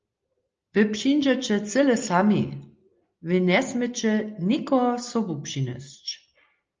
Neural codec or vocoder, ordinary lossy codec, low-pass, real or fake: none; Opus, 24 kbps; 7.2 kHz; real